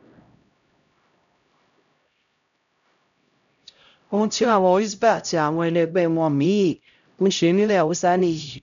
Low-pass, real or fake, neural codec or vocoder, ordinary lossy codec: 7.2 kHz; fake; codec, 16 kHz, 0.5 kbps, X-Codec, HuBERT features, trained on LibriSpeech; none